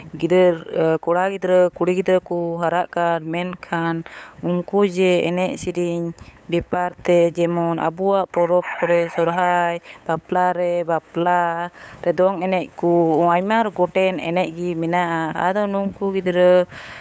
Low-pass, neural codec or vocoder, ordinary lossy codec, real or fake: none; codec, 16 kHz, 8 kbps, FunCodec, trained on LibriTTS, 25 frames a second; none; fake